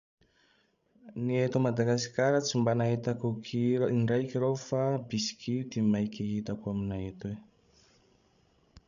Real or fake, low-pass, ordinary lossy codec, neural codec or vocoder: fake; 7.2 kHz; none; codec, 16 kHz, 16 kbps, FreqCodec, larger model